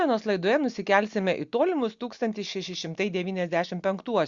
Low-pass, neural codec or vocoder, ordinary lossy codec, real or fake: 7.2 kHz; none; Opus, 64 kbps; real